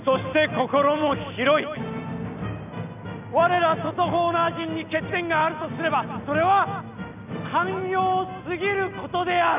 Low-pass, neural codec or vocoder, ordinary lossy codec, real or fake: 3.6 kHz; none; none; real